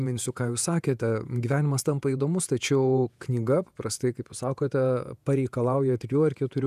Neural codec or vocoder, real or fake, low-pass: vocoder, 48 kHz, 128 mel bands, Vocos; fake; 14.4 kHz